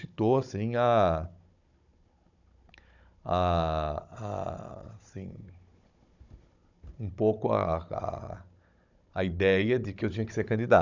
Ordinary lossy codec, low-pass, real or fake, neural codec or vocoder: none; 7.2 kHz; fake; codec, 16 kHz, 16 kbps, FunCodec, trained on Chinese and English, 50 frames a second